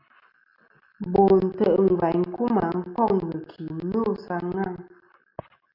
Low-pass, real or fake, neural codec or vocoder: 5.4 kHz; real; none